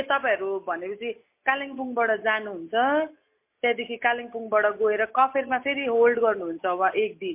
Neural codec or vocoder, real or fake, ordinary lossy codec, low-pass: none; real; MP3, 24 kbps; 3.6 kHz